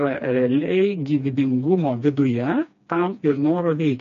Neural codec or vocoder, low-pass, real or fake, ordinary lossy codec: codec, 16 kHz, 2 kbps, FreqCodec, smaller model; 7.2 kHz; fake; MP3, 48 kbps